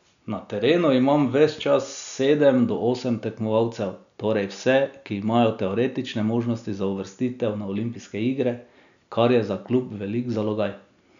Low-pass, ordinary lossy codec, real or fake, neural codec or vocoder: 7.2 kHz; none; real; none